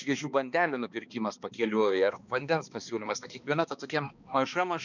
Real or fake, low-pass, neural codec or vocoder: fake; 7.2 kHz; codec, 16 kHz, 2 kbps, X-Codec, HuBERT features, trained on balanced general audio